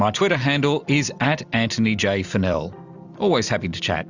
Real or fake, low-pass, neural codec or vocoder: real; 7.2 kHz; none